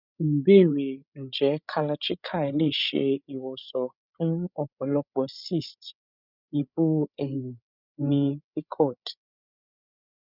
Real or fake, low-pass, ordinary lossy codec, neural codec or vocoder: fake; 5.4 kHz; none; codec, 16 kHz, 16 kbps, FreqCodec, larger model